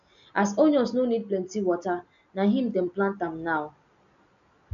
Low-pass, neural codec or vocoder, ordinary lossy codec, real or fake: 7.2 kHz; none; none; real